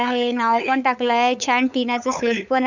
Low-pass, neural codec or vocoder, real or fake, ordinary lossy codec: 7.2 kHz; codec, 16 kHz, 8 kbps, FunCodec, trained on LibriTTS, 25 frames a second; fake; none